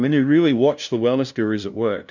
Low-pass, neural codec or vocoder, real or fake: 7.2 kHz; codec, 16 kHz, 0.5 kbps, FunCodec, trained on LibriTTS, 25 frames a second; fake